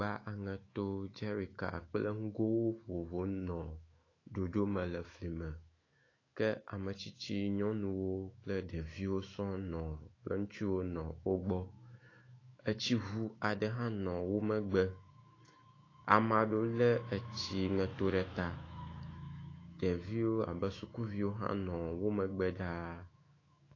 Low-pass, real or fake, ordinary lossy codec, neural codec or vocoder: 7.2 kHz; fake; AAC, 32 kbps; autoencoder, 48 kHz, 128 numbers a frame, DAC-VAE, trained on Japanese speech